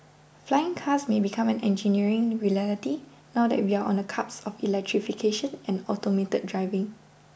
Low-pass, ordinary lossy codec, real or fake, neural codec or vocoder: none; none; real; none